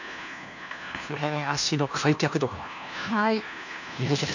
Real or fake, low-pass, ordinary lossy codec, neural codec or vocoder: fake; 7.2 kHz; none; codec, 16 kHz, 1 kbps, FunCodec, trained on LibriTTS, 50 frames a second